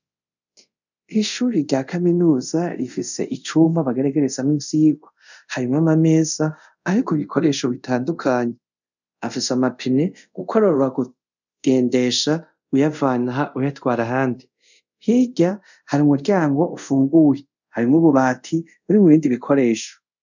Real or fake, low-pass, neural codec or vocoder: fake; 7.2 kHz; codec, 24 kHz, 0.5 kbps, DualCodec